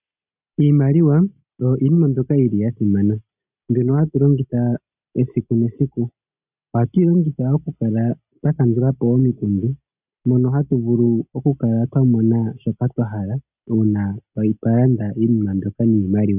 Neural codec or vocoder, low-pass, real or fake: none; 3.6 kHz; real